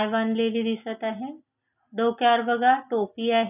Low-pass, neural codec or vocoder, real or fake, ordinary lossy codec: 3.6 kHz; none; real; none